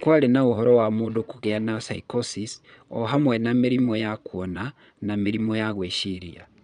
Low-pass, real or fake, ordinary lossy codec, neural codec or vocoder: 9.9 kHz; fake; none; vocoder, 22.05 kHz, 80 mel bands, Vocos